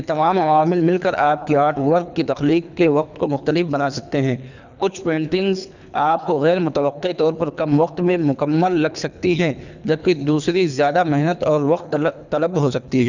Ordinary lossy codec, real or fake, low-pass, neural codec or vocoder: none; fake; 7.2 kHz; codec, 24 kHz, 3 kbps, HILCodec